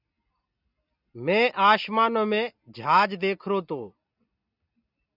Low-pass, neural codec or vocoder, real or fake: 5.4 kHz; none; real